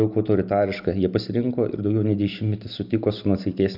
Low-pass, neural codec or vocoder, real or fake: 5.4 kHz; none; real